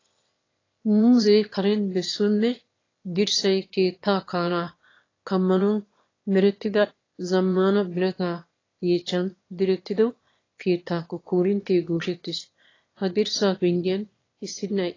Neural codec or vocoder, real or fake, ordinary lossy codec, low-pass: autoencoder, 22.05 kHz, a latent of 192 numbers a frame, VITS, trained on one speaker; fake; AAC, 32 kbps; 7.2 kHz